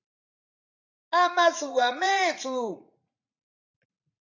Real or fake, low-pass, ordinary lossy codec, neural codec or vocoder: fake; 7.2 kHz; AAC, 48 kbps; vocoder, 44.1 kHz, 80 mel bands, Vocos